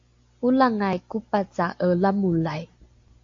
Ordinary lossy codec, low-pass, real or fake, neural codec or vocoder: AAC, 48 kbps; 7.2 kHz; real; none